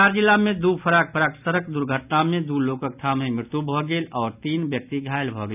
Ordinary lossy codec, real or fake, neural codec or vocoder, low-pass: none; real; none; 3.6 kHz